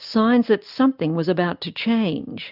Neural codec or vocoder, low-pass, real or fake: none; 5.4 kHz; real